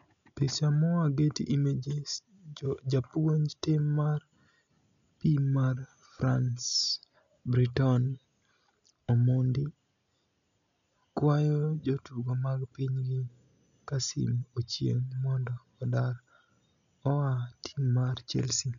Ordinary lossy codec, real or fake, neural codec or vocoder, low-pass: none; real; none; 7.2 kHz